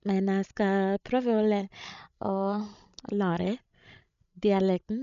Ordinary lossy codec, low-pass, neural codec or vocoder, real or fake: none; 7.2 kHz; codec, 16 kHz, 16 kbps, FreqCodec, larger model; fake